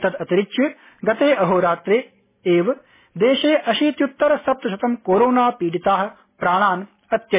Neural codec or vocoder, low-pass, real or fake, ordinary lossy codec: none; 3.6 kHz; real; MP3, 16 kbps